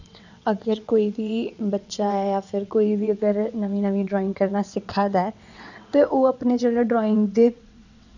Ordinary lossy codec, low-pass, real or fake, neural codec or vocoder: none; 7.2 kHz; fake; vocoder, 22.05 kHz, 80 mel bands, WaveNeXt